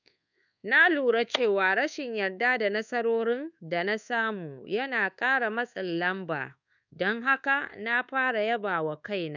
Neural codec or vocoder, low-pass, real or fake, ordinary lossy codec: codec, 24 kHz, 1.2 kbps, DualCodec; 7.2 kHz; fake; none